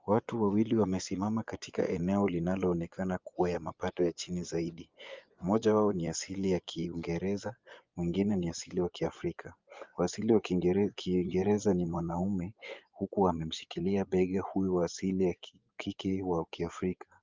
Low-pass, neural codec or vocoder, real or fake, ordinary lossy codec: 7.2 kHz; vocoder, 24 kHz, 100 mel bands, Vocos; fake; Opus, 24 kbps